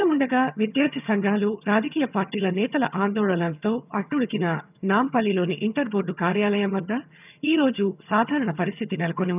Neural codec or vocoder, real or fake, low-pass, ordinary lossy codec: vocoder, 22.05 kHz, 80 mel bands, HiFi-GAN; fake; 3.6 kHz; none